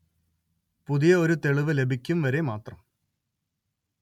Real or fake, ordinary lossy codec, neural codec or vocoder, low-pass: real; MP3, 96 kbps; none; 19.8 kHz